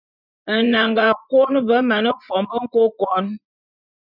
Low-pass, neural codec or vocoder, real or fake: 5.4 kHz; vocoder, 24 kHz, 100 mel bands, Vocos; fake